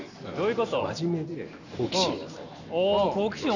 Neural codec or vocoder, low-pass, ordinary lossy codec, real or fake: none; 7.2 kHz; none; real